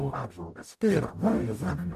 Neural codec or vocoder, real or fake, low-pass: codec, 44.1 kHz, 0.9 kbps, DAC; fake; 14.4 kHz